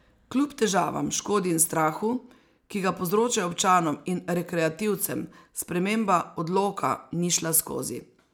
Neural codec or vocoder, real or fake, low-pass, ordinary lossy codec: none; real; none; none